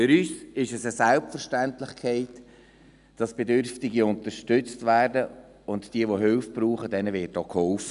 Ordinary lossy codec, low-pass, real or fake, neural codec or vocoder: none; 10.8 kHz; real; none